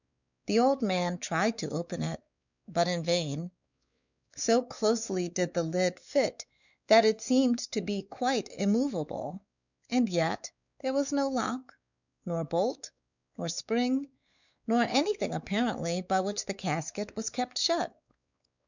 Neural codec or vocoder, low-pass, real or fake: codec, 16 kHz, 4 kbps, X-Codec, WavLM features, trained on Multilingual LibriSpeech; 7.2 kHz; fake